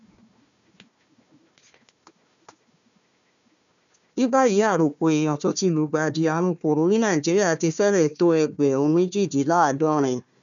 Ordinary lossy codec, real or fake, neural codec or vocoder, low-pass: none; fake; codec, 16 kHz, 1 kbps, FunCodec, trained on Chinese and English, 50 frames a second; 7.2 kHz